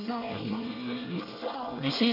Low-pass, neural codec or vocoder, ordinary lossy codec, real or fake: 5.4 kHz; codec, 24 kHz, 1 kbps, SNAC; none; fake